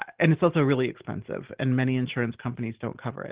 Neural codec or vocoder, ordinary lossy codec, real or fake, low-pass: none; Opus, 16 kbps; real; 3.6 kHz